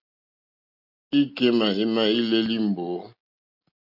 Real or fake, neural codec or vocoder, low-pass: real; none; 5.4 kHz